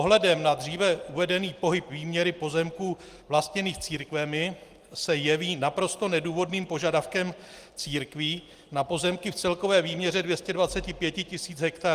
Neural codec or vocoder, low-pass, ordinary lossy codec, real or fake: none; 14.4 kHz; Opus, 24 kbps; real